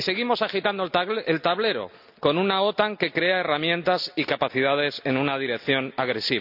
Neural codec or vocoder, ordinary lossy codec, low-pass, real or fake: none; none; 5.4 kHz; real